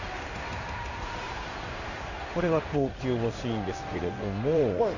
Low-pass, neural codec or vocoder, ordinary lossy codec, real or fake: 7.2 kHz; codec, 16 kHz in and 24 kHz out, 1 kbps, XY-Tokenizer; none; fake